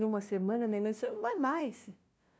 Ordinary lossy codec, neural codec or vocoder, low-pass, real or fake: none; codec, 16 kHz, 0.5 kbps, FunCodec, trained on LibriTTS, 25 frames a second; none; fake